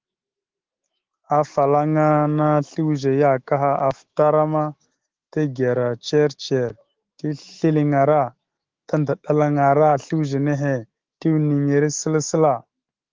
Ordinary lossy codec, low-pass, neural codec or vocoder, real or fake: Opus, 16 kbps; 7.2 kHz; autoencoder, 48 kHz, 128 numbers a frame, DAC-VAE, trained on Japanese speech; fake